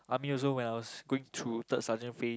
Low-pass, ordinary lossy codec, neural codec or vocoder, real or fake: none; none; none; real